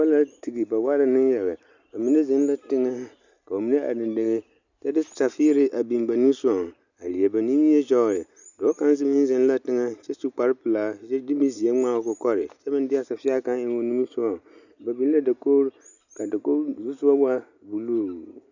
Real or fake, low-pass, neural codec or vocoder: real; 7.2 kHz; none